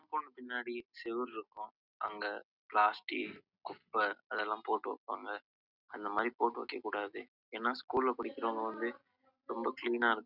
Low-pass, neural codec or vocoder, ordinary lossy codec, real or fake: 5.4 kHz; none; none; real